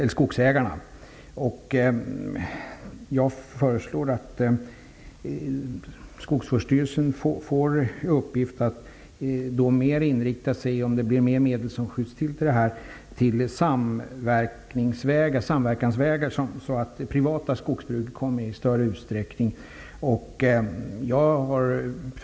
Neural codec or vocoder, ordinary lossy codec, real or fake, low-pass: none; none; real; none